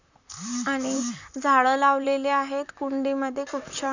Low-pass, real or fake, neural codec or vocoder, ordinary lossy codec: 7.2 kHz; fake; vocoder, 44.1 kHz, 128 mel bands, Pupu-Vocoder; none